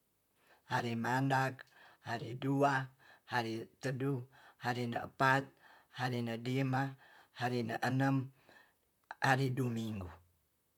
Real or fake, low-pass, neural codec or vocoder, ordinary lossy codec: fake; 19.8 kHz; vocoder, 44.1 kHz, 128 mel bands, Pupu-Vocoder; none